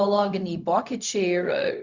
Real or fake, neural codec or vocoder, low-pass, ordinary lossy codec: fake; codec, 16 kHz, 0.4 kbps, LongCat-Audio-Codec; 7.2 kHz; Opus, 64 kbps